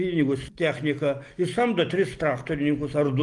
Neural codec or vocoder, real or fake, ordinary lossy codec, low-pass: none; real; Opus, 24 kbps; 10.8 kHz